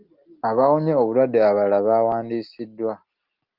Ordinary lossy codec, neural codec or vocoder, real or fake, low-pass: Opus, 32 kbps; none; real; 5.4 kHz